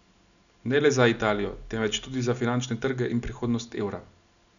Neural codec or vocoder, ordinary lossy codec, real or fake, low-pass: none; none; real; 7.2 kHz